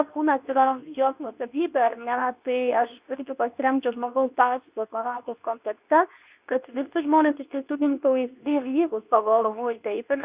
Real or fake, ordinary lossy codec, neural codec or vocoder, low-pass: fake; Opus, 64 kbps; codec, 24 kHz, 0.9 kbps, WavTokenizer, medium speech release version 2; 3.6 kHz